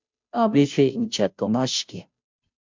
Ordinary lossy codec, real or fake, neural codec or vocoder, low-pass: MP3, 64 kbps; fake; codec, 16 kHz, 0.5 kbps, FunCodec, trained on Chinese and English, 25 frames a second; 7.2 kHz